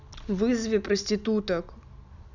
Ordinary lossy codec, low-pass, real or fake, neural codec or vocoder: none; 7.2 kHz; real; none